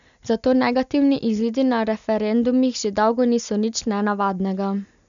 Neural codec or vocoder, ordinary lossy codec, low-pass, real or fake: none; none; 7.2 kHz; real